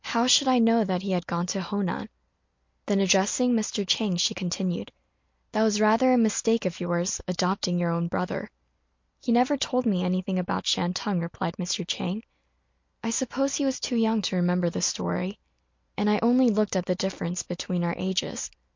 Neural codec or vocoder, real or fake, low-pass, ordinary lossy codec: none; real; 7.2 kHz; MP3, 48 kbps